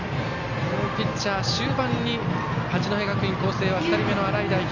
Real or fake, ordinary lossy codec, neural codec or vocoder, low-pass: real; none; none; 7.2 kHz